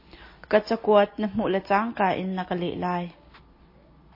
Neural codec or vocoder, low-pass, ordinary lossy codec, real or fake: none; 5.4 kHz; MP3, 24 kbps; real